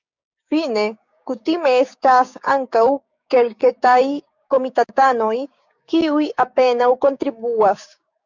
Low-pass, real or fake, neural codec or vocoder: 7.2 kHz; fake; codec, 16 kHz, 6 kbps, DAC